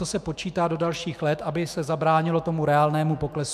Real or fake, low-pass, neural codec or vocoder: fake; 14.4 kHz; autoencoder, 48 kHz, 128 numbers a frame, DAC-VAE, trained on Japanese speech